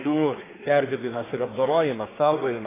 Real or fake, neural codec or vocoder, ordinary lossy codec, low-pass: fake; codec, 24 kHz, 1 kbps, SNAC; AAC, 16 kbps; 3.6 kHz